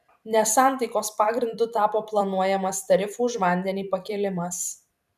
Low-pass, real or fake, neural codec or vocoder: 14.4 kHz; fake; vocoder, 44.1 kHz, 128 mel bands every 256 samples, BigVGAN v2